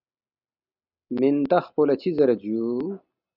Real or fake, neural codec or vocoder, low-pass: real; none; 5.4 kHz